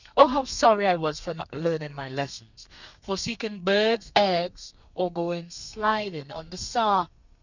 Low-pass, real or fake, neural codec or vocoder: 7.2 kHz; fake; codec, 32 kHz, 1.9 kbps, SNAC